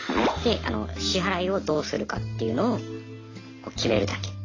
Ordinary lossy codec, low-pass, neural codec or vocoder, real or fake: AAC, 32 kbps; 7.2 kHz; none; real